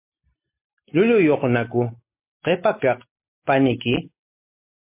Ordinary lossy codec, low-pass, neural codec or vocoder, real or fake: MP3, 24 kbps; 3.6 kHz; none; real